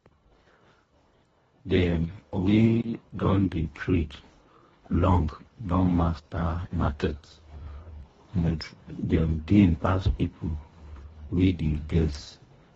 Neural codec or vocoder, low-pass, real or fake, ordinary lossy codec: codec, 24 kHz, 1.5 kbps, HILCodec; 10.8 kHz; fake; AAC, 24 kbps